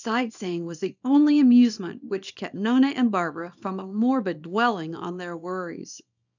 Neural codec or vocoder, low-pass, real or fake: codec, 24 kHz, 0.9 kbps, WavTokenizer, small release; 7.2 kHz; fake